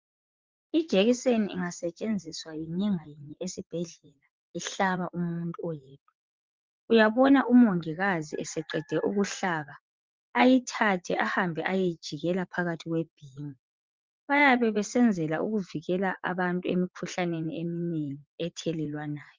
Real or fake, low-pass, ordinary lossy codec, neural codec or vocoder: fake; 7.2 kHz; Opus, 32 kbps; vocoder, 44.1 kHz, 80 mel bands, Vocos